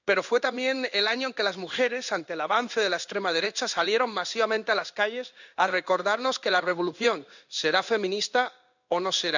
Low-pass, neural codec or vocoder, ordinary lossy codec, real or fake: 7.2 kHz; codec, 16 kHz in and 24 kHz out, 1 kbps, XY-Tokenizer; none; fake